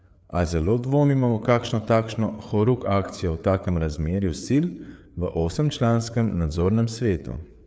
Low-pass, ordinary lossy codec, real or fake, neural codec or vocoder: none; none; fake; codec, 16 kHz, 4 kbps, FreqCodec, larger model